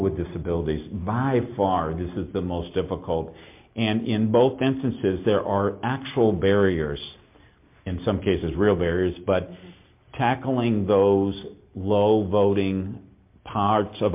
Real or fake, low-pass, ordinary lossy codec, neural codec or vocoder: real; 3.6 kHz; MP3, 32 kbps; none